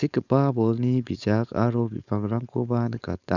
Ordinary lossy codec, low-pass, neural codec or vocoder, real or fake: none; 7.2 kHz; codec, 16 kHz, 4.8 kbps, FACodec; fake